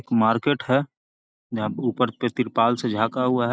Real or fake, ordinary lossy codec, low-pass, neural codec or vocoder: real; none; none; none